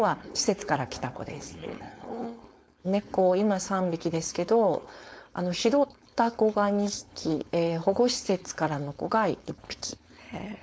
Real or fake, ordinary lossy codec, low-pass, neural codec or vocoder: fake; none; none; codec, 16 kHz, 4.8 kbps, FACodec